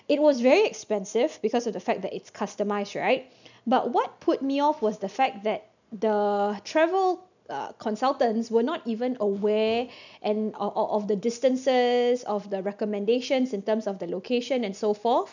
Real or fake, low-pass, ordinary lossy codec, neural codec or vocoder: real; 7.2 kHz; none; none